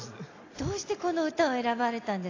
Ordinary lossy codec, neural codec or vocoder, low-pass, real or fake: AAC, 32 kbps; none; 7.2 kHz; real